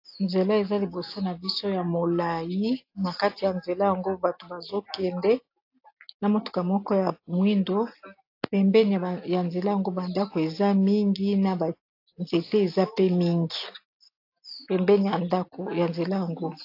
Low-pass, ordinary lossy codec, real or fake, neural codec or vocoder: 5.4 kHz; AAC, 32 kbps; real; none